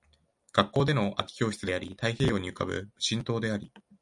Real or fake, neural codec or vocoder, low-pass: real; none; 10.8 kHz